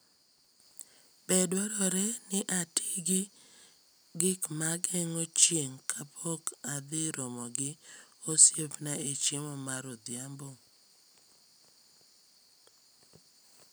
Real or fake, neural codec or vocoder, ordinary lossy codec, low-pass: real; none; none; none